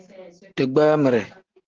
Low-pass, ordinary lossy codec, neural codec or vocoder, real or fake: 7.2 kHz; Opus, 16 kbps; none; real